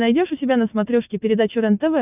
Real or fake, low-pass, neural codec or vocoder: real; 3.6 kHz; none